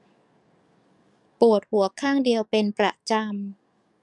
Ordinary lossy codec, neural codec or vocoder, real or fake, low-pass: none; codec, 44.1 kHz, 7.8 kbps, DAC; fake; 10.8 kHz